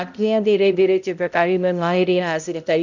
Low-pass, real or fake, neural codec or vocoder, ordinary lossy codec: 7.2 kHz; fake; codec, 16 kHz, 0.5 kbps, X-Codec, HuBERT features, trained on balanced general audio; none